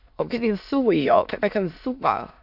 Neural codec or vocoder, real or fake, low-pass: autoencoder, 22.05 kHz, a latent of 192 numbers a frame, VITS, trained on many speakers; fake; 5.4 kHz